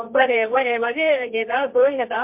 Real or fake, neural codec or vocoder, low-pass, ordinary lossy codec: fake; codec, 24 kHz, 0.9 kbps, WavTokenizer, medium music audio release; 3.6 kHz; MP3, 32 kbps